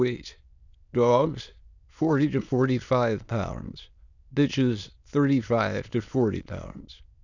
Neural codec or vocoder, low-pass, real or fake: autoencoder, 22.05 kHz, a latent of 192 numbers a frame, VITS, trained on many speakers; 7.2 kHz; fake